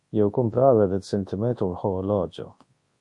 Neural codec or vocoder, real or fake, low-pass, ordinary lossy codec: codec, 24 kHz, 0.9 kbps, WavTokenizer, large speech release; fake; 10.8 kHz; MP3, 48 kbps